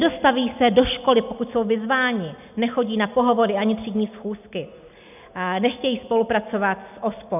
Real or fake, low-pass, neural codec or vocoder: real; 3.6 kHz; none